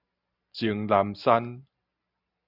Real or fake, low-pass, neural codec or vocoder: real; 5.4 kHz; none